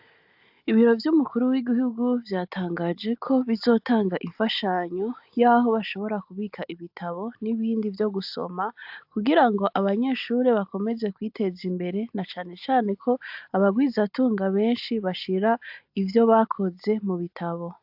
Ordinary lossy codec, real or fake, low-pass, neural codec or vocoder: AAC, 48 kbps; real; 5.4 kHz; none